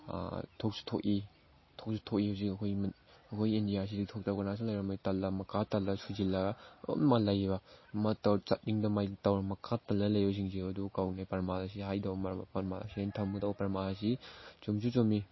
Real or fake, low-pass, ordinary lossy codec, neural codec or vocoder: real; 7.2 kHz; MP3, 24 kbps; none